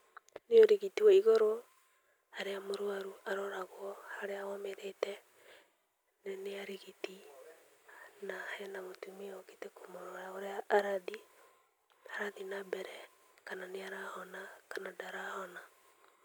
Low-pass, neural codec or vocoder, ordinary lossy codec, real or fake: none; none; none; real